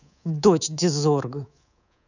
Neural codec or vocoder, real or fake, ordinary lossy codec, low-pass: codec, 24 kHz, 3.1 kbps, DualCodec; fake; none; 7.2 kHz